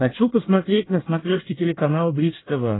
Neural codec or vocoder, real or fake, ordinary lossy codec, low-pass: codec, 24 kHz, 1 kbps, SNAC; fake; AAC, 16 kbps; 7.2 kHz